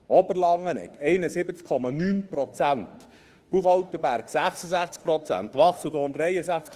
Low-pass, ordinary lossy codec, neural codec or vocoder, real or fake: 14.4 kHz; Opus, 24 kbps; autoencoder, 48 kHz, 32 numbers a frame, DAC-VAE, trained on Japanese speech; fake